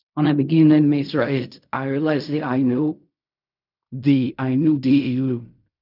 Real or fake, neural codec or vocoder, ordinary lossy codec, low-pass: fake; codec, 16 kHz in and 24 kHz out, 0.4 kbps, LongCat-Audio-Codec, fine tuned four codebook decoder; none; 5.4 kHz